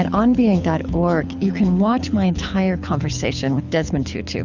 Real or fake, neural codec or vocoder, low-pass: fake; codec, 24 kHz, 6 kbps, HILCodec; 7.2 kHz